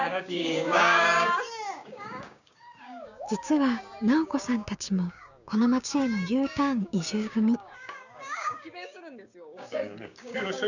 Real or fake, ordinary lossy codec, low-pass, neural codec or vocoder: fake; none; 7.2 kHz; codec, 16 kHz, 6 kbps, DAC